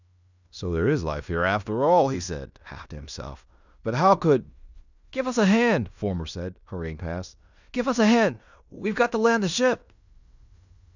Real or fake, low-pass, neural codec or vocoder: fake; 7.2 kHz; codec, 16 kHz in and 24 kHz out, 0.9 kbps, LongCat-Audio-Codec, fine tuned four codebook decoder